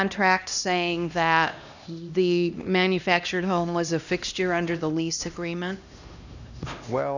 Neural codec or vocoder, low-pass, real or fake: codec, 16 kHz, 1 kbps, X-Codec, HuBERT features, trained on LibriSpeech; 7.2 kHz; fake